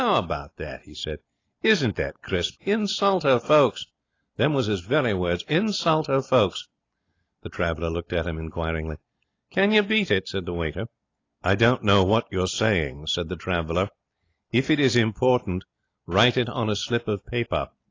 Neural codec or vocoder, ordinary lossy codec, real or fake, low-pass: none; AAC, 32 kbps; real; 7.2 kHz